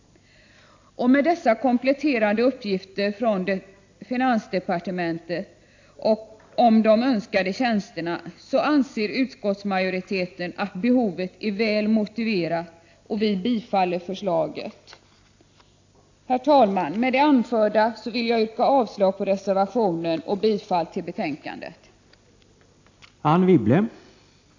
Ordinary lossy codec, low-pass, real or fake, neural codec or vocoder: AAC, 48 kbps; 7.2 kHz; real; none